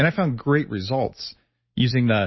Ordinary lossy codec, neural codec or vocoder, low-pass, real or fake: MP3, 24 kbps; none; 7.2 kHz; real